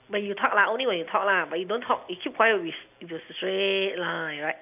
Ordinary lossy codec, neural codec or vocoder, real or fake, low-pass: AAC, 32 kbps; none; real; 3.6 kHz